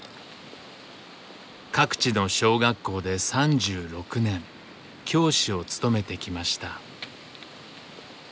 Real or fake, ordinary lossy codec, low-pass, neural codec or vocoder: real; none; none; none